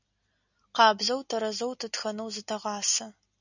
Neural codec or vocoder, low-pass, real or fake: none; 7.2 kHz; real